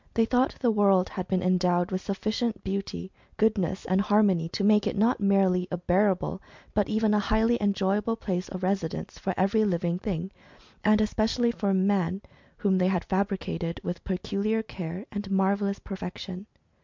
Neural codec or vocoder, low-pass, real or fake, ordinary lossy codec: none; 7.2 kHz; real; MP3, 64 kbps